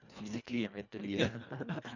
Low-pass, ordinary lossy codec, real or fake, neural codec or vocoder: 7.2 kHz; none; fake; codec, 24 kHz, 1.5 kbps, HILCodec